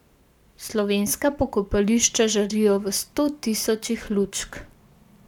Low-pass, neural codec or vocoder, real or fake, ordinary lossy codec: 19.8 kHz; codec, 44.1 kHz, 7.8 kbps, Pupu-Codec; fake; none